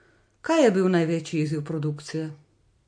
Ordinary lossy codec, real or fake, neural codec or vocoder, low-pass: MP3, 48 kbps; real; none; 9.9 kHz